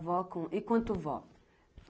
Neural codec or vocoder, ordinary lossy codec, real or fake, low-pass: none; none; real; none